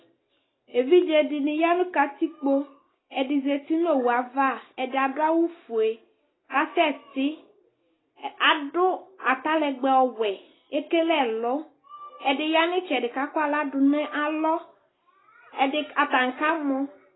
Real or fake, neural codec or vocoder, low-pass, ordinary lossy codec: real; none; 7.2 kHz; AAC, 16 kbps